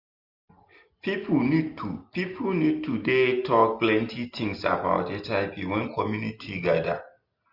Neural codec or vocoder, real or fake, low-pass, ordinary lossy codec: none; real; 5.4 kHz; Opus, 64 kbps